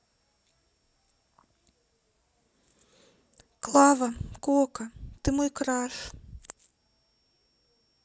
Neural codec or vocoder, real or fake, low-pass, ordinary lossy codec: none; real; none; none